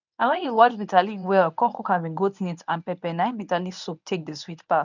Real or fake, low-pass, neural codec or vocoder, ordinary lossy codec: fake; 7.2 kHz; codec, 24 kHz, 0.9 kbps, WavTokenizer, medium speech release version 1; none